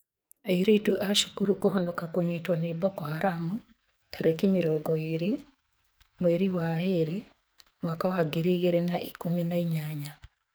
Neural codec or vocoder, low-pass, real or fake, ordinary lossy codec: codec, 44.1 kHz, 2.6 kbps, SNAC; none; fake; none